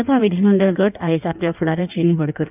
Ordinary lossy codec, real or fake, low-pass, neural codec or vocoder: none; fake; 3.6 kHz; codec, 16 kHz in and 24 kHz out, 1.1 kbps, FireRedTTS-2 codec